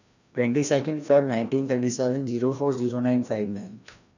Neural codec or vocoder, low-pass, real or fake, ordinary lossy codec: codec, 16 kHz, 1 kbps, FreqCodec, larger model; 7.2 kHz; fake; none